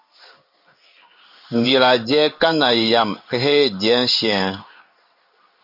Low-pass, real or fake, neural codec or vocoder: 5.4 kHz; fake; codec, 16 kHz in and 24 kHz out, 1 kbps, XY-Tokenizer